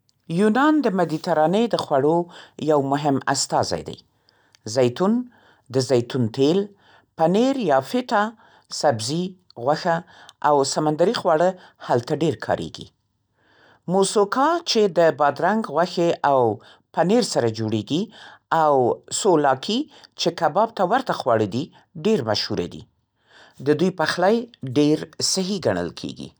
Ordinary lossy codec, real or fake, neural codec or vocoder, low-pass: none; real; none; none